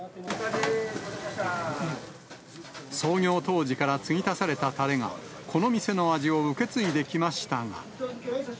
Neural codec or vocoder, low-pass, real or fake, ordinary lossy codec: none; none; real; none